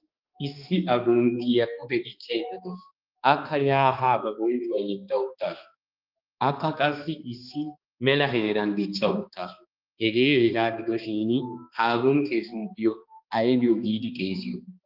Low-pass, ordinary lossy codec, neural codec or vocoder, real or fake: 5.4 kHz; Opus, 24 kbps; codec, 16 kHz, 2 kbps, X-Codec, HuBERT features, trained on balanced general audio; fake